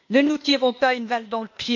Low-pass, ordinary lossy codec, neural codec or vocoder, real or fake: 7.2 kHz; MP3, 48 kbps; codec, 16 kHz, 0.8 kbps, ZipCodec; fake